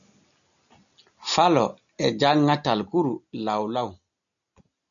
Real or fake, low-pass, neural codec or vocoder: real; 7.2 kHz; none